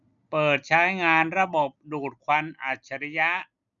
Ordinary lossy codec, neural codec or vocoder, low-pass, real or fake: none; none; 7.2 kHz; real